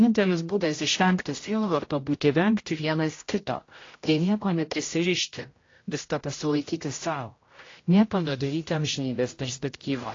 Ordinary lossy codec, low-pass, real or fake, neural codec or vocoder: AAC, 32 kbps; 7.2 kHz; fake; codec, 16 kHz, 0.5 kbps, X-Codec, HuBERT features, trained on general audio